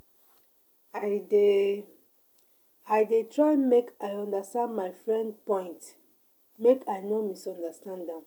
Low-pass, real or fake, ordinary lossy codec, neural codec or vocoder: none; real; none; none